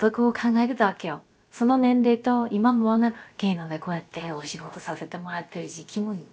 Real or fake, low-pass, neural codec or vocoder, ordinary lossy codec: fake; none; codec, 16 kHz, about 1 kbps, DyCAST, with the encoder's durations; none